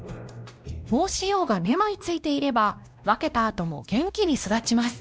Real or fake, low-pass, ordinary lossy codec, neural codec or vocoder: fake; none; none; codec, 16 kHz, 1 kbps, X-Codec, WavLM features, trained on Multilingual LibriSpeech